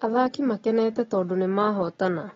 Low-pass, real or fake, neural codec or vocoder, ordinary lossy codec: 7.2 kHz; real; none; AAC, 24 kbps